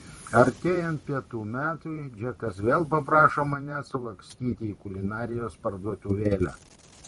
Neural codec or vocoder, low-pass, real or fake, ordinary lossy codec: vocoder, 44.1 kHz, 128 mel bands every 512 samples, BigVGAN v2; 19.8 kHz; fake; MP3, 48 kbps